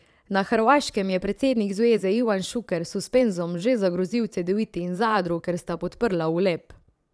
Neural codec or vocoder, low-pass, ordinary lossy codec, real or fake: vocoder, 22.05 kHz, 80 mel bands, Vocos; none; none; fake